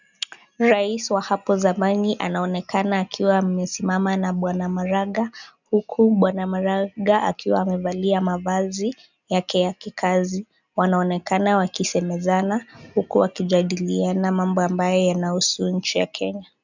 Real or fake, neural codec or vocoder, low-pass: real; none; 7.2 kHz